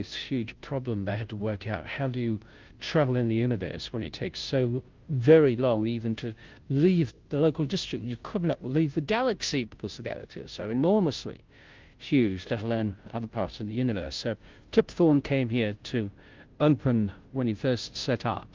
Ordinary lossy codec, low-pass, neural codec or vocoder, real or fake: Opus, 24 kbps; 7.2 kHz; codec, 16 kHz, 0.5 kbps, FunCodec, trained on Chinese and English, 25 frames a second; fake